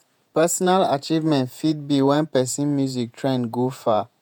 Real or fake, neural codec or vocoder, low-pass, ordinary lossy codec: fake; vocoder, 48 kHz, 128 mel bands, Vocos; none; none